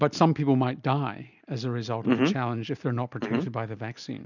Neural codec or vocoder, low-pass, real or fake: none; 7.2 kHz; real